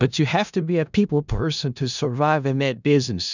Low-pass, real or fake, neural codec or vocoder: 7.2 kHz; fake; codec, 16 kHz in and 24 kHz out, 0.4 kbps, LongCat-Audio-Codec, four codebook decoder